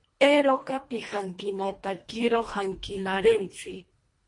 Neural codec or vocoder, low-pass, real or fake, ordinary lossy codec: codec, 24 kHz, 1.5 kbps, HILCodec; 10.8 kHz; fake; MP3, 48 kbps